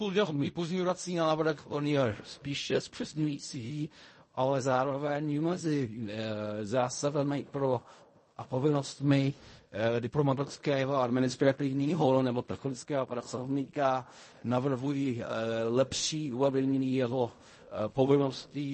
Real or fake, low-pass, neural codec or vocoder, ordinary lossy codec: fake; 10.8 kHz; codec, 16 kHz in and 24 kHz out, 0.4 kbps, LongCat-Audio-Codec, fine tuned four codebook decoder; MP3, 32 kbps